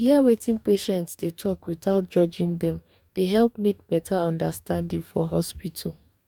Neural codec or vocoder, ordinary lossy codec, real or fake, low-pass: codec, 44.1 kHz, 2.6 kbps, DAC; none; fake; 19.8 kHz